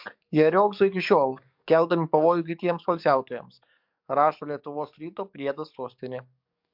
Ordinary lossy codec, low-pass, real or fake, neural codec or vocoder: MP3, 48 kbps; 5.4 kHz; fake; codec, 44.1 kHz, 7.8 kbps, DAC